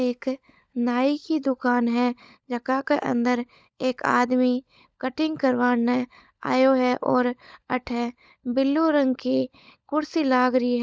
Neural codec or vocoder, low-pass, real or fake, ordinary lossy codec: codec, 16 kHz, 16 kbps, FunCodec, trained on LibriTTS, 50 frames a second; none; fake; none